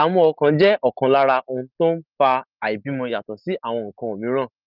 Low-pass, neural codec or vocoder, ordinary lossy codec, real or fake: 5.4 kHz; none; Opus, 32 kbps; real